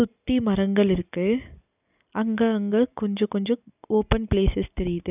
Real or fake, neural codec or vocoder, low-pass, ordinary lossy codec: real; none; 3.6 kHz; none